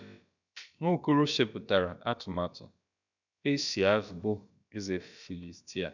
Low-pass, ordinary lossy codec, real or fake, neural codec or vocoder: 7.2 kHz; none; fake; codec, 16 kHz, about 1 kbps, DyCAST, with the encoder's durations